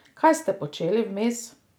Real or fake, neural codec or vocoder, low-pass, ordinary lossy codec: real; none; none; none